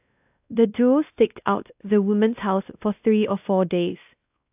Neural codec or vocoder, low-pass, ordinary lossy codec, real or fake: codec, 16 kHz, 1 kbps, X-Codec, WavLM features, trained on Multilingual LibriSpeech; 3.6 kHz; AAC, 32 kbps; fake